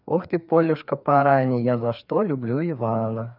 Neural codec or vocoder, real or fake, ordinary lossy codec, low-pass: codec, 16 kHz, 2 kbps, FreqCodec, larger model; fake; none; 5.4 kHz